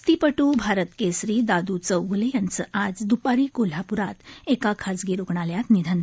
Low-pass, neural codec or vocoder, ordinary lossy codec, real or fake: none; none; none; real